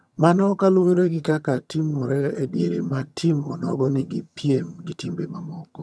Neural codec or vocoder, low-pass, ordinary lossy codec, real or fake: vocoder, 22.05 kHz, 80 mel bands, HiFi-GAN; none; none; fake